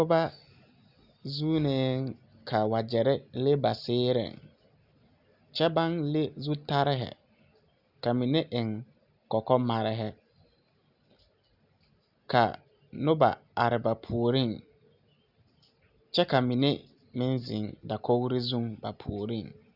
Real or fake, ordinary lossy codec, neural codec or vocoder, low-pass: real; Opus, 64 kbps; none; 5.4 kHz